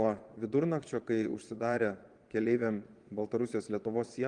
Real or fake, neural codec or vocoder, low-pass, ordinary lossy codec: fake; vocoder, 22.05 kHz, 80 mel bands, Vocos; 9.9 kHz; Opus, 24 kbps